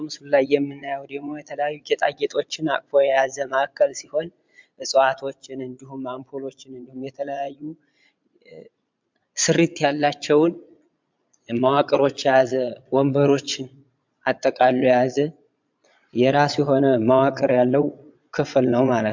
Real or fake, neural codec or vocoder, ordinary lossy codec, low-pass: fake; vocoder, 22.05 kHz, 80 mel bands, Vocos; MP3, 64 kbps; 7.2 kHz